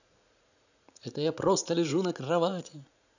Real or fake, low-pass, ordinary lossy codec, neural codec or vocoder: fake; 7.2 kHz; none; vocoder, 44.1 kHz, 80 mel bands, Vocos